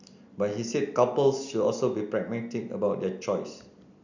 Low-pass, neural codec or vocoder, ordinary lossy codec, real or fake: 7.2 kHz; none; none; real